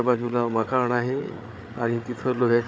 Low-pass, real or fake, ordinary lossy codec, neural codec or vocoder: none; fake; none; codec, 16 kHz, 8 kbps, FreqCodec, larger model